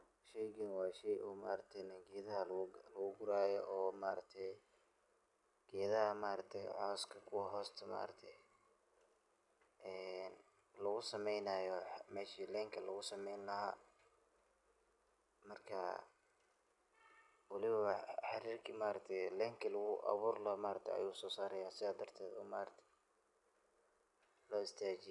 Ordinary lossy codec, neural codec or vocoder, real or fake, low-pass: none; none; real; 10.8 kHz